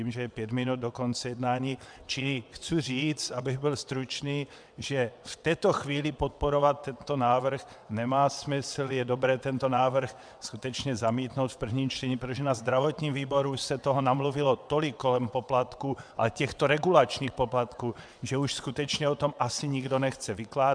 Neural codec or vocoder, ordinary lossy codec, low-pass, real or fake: vocoder, 22.05 kHz, 80 mel bands, WaveNeXt; MP3, 96 kbps; 9.9 kHz; fake